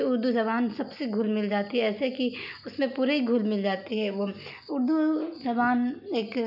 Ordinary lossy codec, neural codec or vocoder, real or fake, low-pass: none; none; real; 5.4 kHz